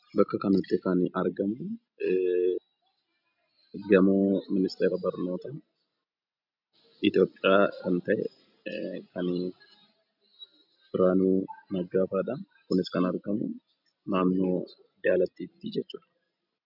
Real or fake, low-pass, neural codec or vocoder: real; 5.4 kHz; none